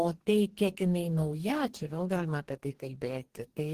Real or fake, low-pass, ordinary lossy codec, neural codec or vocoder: fake; 14.4 kHz; Opus, 16 kbps; codec, 44.1 kHz, 2.6 kbps, DAC